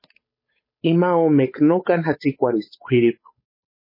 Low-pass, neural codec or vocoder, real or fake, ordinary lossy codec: 5.4 kHz; codec, 16 kHz, 8 kbps, FunCodec, trained on Chinese and English, 25 frames a second; fake; MP3, 24 kbps